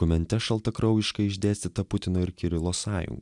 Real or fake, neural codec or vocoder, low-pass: real; none; 10.8 kHz